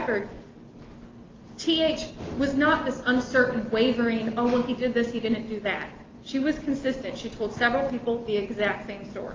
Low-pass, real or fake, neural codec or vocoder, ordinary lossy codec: 7.2 kHz; real; none; Opus, 24 kbps